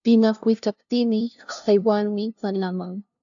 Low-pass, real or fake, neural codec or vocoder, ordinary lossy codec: 7.2 kHz; fake; codec, 16 kHz, 0.5 kbps, FunCodec, trained on LibriTTS, 25 frames a second; AAC, 64 kbps